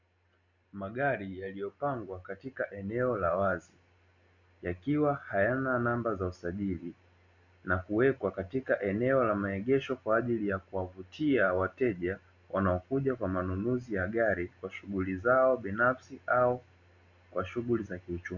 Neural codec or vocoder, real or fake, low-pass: none; real; 7.2 kHz